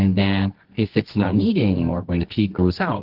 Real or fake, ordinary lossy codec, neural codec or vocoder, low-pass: fake; Opus, 16 kbps; codec, 24 kHz, 0.9 kbps, WavTokenizer, medium music audio release; 5.4 kHz